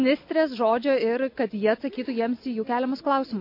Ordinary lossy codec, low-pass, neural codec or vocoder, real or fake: MP3, 32 kbps; 5.4 kHz; none; real